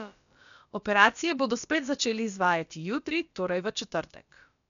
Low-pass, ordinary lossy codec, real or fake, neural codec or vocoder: 7.2 kHz; none; fake; codec, 16 kHz, about 1 kbps, DyCAST, with the encoder's durations